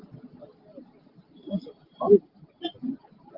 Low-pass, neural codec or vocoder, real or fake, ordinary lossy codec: 5.4 kHz; none; real; Opus, 16 kbps